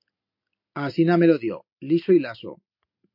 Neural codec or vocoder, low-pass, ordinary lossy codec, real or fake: none; 5.4 kHz; MP3, 32 kbps; real